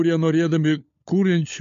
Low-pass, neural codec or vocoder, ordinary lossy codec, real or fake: 7.2 kHz; codec, 16 kHz, 16 kbps, FunCodec, trained on LibriTTS, 50 frames a second; MP3, 48 kbps; fake